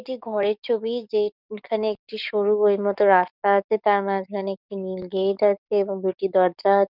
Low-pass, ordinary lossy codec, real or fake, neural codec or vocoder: 5.4 kHz; none; fake; codec, 44.1 kHz, 7.8 kbps, DAC